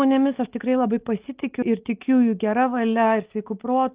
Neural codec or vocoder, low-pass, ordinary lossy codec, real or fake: none; 3.6 kHz; Opus, 32 kbps; real